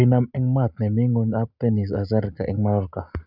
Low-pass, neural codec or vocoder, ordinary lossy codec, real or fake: 5.4 kHz; none; none; real